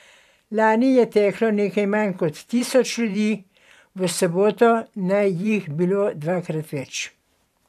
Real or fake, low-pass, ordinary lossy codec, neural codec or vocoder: fake; 14.4 kHz; none; vocoder, 44.1 kHz, 128 mel bands every 512 samples, BigVGAN v2